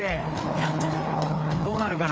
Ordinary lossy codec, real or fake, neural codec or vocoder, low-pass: none; fake; codec, 16 kHz, 4 kbps, FreqCodec, larger model; none